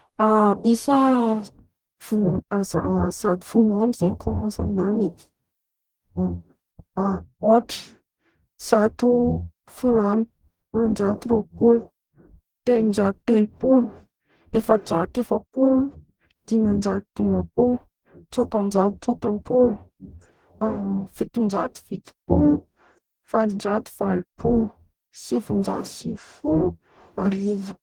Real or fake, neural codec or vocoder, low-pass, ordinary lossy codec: fake; codec, 44.1 kHz, 0.9 kbps, DAC; 19.8 kHz; Opus, 24 kbps